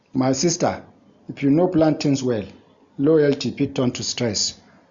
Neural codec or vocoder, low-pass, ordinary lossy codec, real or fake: none; 7.2 kHz; Opus, 64 kbps; real